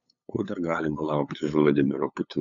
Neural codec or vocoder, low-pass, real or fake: codec, 16 kHz, 8 kbps, FunCodec, trained on LibriTTS, 25 frames a second; 7.2 kHz; fake